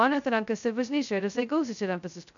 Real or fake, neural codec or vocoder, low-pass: fake; codec, 16 kHz, 0.2 kbps, FocalCodec; 7.2 kHz